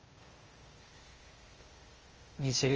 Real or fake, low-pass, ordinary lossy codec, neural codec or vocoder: fake; 7.2 kHz; Opus, 24 kbps; codec, 16 kHz, 0.8 kbps, ZipCodec